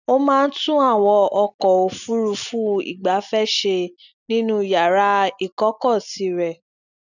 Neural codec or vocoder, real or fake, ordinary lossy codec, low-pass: none; real; none; 7.2 kHz